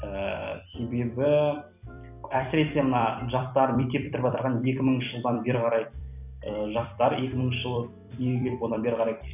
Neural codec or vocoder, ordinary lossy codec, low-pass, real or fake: vocoder, 44.1 kHz, 128 mel bands every 256 samples, BigVGAN v2; none; 3.6 kHz; fake